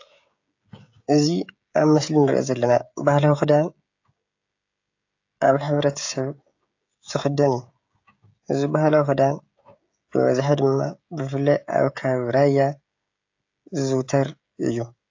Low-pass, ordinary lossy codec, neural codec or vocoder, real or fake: 7.2 kHz; AAC, 48 kbps; codec, 16 kHz, 16 kbps, FreqCodec, smaller model; fake